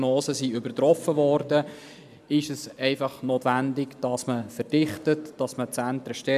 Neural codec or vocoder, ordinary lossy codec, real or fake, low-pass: none; MP3, 96 kbps; real; 14.4 kHz